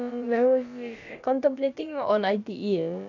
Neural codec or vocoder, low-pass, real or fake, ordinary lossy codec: codec, 16 kHz, about 1 kbps, DyCAST, with the encoder's durations; 7.2 kHz; fake; none